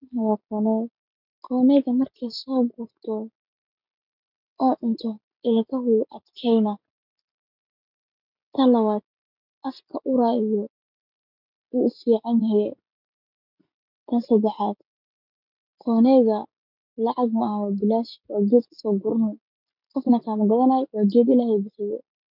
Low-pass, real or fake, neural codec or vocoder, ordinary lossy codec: 5.4 kHz; real; none; none